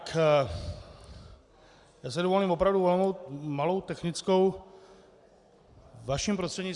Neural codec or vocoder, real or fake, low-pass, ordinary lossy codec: none; real; 10.8 kHz; Opus, 64 kbps